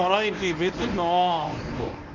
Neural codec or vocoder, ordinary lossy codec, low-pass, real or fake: codec, 24 kHz, 0.9 kbps, WavTokenizer, medium speech release version 1; none; 7.2 kHz; fake